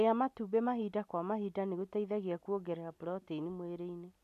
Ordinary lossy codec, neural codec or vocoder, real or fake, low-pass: none; none; real; 14.4 kHz